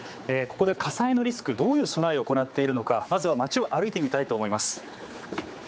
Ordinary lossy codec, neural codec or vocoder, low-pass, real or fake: none; codec, 16 kHz, 4 kbps, X-Codec, HuBERT features, trained on general audio; none; fake